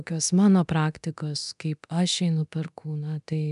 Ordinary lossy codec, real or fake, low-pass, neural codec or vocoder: MP3, 96 kbps; fake; 10.8 kHz; codec, 24 kHz, 0.9 kbps, DualCodec